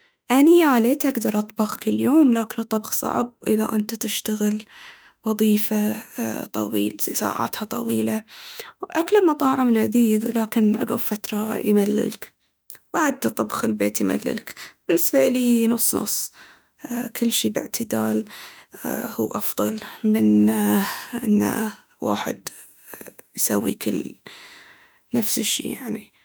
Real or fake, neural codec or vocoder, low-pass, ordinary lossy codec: fake; autoencoder, 48 kHz, 32 numbers a frame, DAC-VAE, trained on Japanese speech; none; none